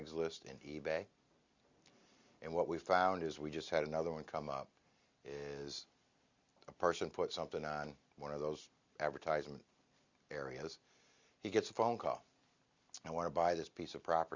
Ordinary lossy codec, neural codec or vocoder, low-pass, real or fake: Opus, 64 kbps; none; 7.2 kHz; real